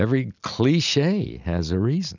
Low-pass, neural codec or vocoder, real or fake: 7.2 kHz; none; real